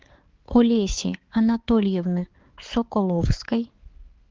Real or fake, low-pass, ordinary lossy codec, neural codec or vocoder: fake; 7.2 kHz; Opus, 16 kbps; codec, 16 kHz, 4 kbps, X-Codec, HuBERT features, trained on balanced general audio